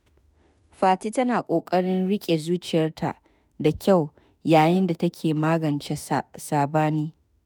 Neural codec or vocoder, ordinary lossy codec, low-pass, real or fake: autoencoder, 48 kHz, 32 numbers a frame, DAC-VAE, trained on Japanese speech; none; none; fake